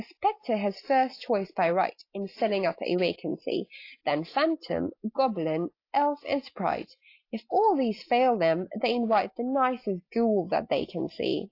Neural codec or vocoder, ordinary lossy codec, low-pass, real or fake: none; AAC, 32 kbps; 5.4 kHz; real